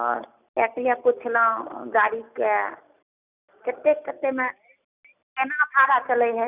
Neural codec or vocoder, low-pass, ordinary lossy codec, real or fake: none; 3.6 kHz; none; real